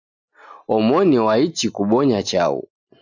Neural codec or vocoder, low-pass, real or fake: none; 7.2 kHz; real